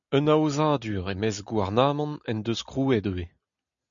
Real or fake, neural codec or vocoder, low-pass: real; none; 7.2 kHz